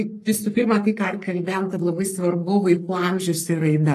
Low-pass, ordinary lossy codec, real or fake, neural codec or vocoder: 14.4 kHz; AAC, 48 kbps; fake; codec, 44.1 kHz, 3.4 kbps, Pupu-Codec